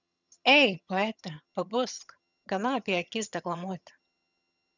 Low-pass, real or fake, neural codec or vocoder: 7.2 kHz; fake; vocoder, 22.05 kHz, 80 mel bands, HiFi-GAN